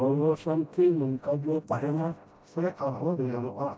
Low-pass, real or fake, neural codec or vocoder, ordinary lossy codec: none; fake; codec, 16 kHz, 0.5 kbps, FreqCodec, smaller model; none